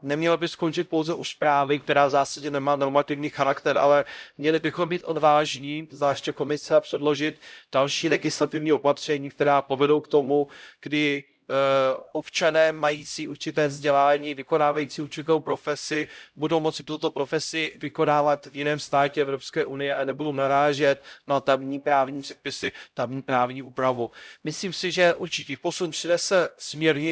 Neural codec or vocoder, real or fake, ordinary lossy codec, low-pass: codec, 16 kHz, 0.5 kbps, X-Codec, HuBERT features, trained on LibriSpeech; fake; none; none